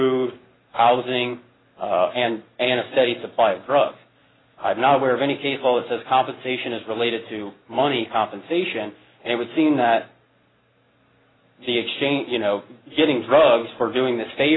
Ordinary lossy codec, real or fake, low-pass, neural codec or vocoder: AAC, 16 kbps; real; 7.2 kHz; none